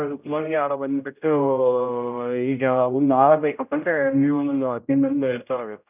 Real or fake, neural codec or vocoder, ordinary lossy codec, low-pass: fake; codec, 16 kHz, 0.5 kbps, X-Codec, HuBERT features, trained on general audio; none; 3.6 kHz